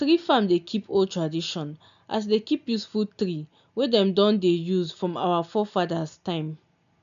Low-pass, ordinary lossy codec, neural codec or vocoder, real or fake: 7.2 kHz; none; none; real